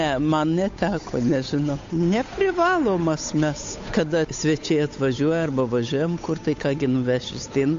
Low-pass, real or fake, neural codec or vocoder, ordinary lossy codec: 7.2 kHz; fake; codec, 16 kHz, 8 kbps, FunCodec, trained on Chinese and English, 25 frames a second; AAC, 48 kbps